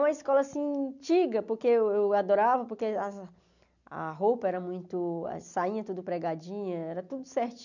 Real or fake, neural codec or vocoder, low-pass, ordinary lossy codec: real; none; 7.2 kHz; none